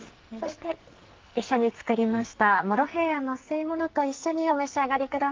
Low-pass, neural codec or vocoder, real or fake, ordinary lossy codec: 7.2 kHz; codec, 44.1 kHz, 2.6 kbps, SNAC; fake; Opus, 32 kbps